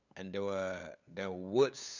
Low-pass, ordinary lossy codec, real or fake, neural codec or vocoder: 7.2 kHz; none; fake; codec, 16 kHz, 8 kbps, FunCodec, trained on LibriTTS, 25 frames a second